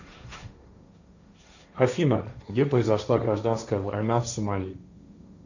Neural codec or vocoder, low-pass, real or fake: codec, 16 kHz, 1.1 kbps, Voila-Tokenizer; 7.2 kHz; fake